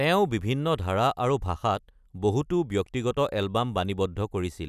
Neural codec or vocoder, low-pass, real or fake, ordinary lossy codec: none; 14.4 kHz; real; none